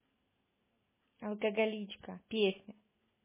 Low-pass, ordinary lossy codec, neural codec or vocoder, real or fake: 3.6 kHz; MP3, 16 kbps; none; real